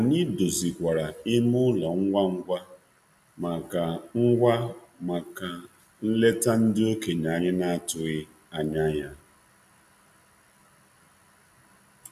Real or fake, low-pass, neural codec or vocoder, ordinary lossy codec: real; 14.4 kHz; none; none